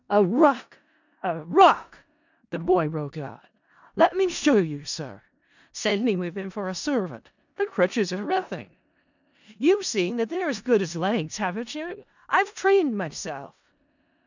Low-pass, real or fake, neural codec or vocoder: 7.2 kHz; fake; codec, 16 kHz in and 24 kHz out, 0.4 kbps, LongCat-Audio-Codec, four codebook decoder